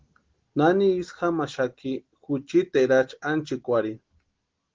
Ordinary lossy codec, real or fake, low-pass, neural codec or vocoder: Opus, 16 kbps; real; 7.2 kHz; none